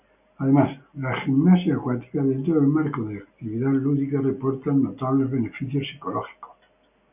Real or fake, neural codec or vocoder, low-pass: real; none; 3.6 kHz